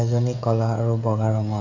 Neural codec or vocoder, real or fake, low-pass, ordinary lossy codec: codec, 16 kHz, 16 kbps, FreqCodec, smaller model; fake; 7.2 kHz; none